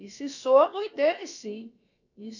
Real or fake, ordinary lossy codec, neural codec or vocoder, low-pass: fake; none; codec, 16 kHz, about 1 kbps, DyCAST, with the encoder's durations; 7.2 kHz